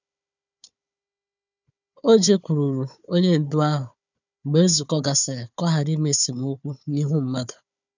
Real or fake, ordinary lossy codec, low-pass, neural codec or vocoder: fake; none; 7.2 kHz; codec, 16 kHz, 4 kbps, FunCodec, trained on Chinese and English, 50 frames a second